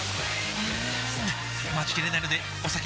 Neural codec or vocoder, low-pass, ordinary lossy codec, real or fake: none; none; none; real